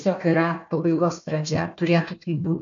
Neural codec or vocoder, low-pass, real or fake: codec, 16 kHz, 1 kbps, FunCodec, trained on Chinese and English, 50 frames a second; 7.2 kHz; fake